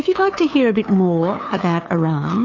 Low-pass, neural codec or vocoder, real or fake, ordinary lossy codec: 7.2 kHz; codec, 16 kHz, 2 kbps, FunCodec, trained on LibriTTS, 25 frames a second; fake; AAC, 32 kbps